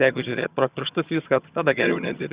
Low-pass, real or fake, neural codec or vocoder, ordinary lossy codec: 3.6 kHz; fake; vocoder, 22.05 kHz, 80 mel bands, HiFi-GAN; Opus, 64 kbps